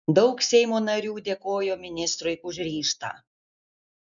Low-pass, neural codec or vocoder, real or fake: 7.2 kHz; none; real